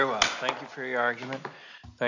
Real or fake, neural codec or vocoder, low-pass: real; none; 7.2 kHz